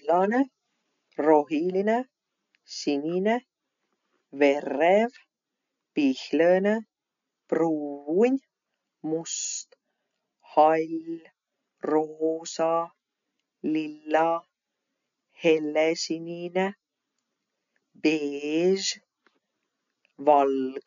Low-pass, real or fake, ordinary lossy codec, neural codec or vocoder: 7.2 kHz; real; MP3, 96 kbps; none